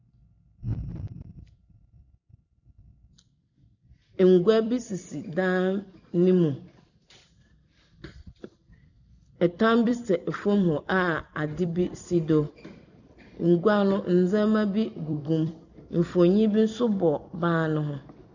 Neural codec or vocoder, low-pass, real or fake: vocoder, 44.1 kHz, 128 mel bands every 256 samples, BigVGAN v2; 7.2 kHz; fake